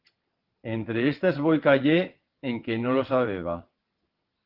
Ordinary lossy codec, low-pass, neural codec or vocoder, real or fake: Opus, 16 kbps; 5.4 kHz; vocoder, 22.05 kHz, 80 mel bands, WaveNeXt; fake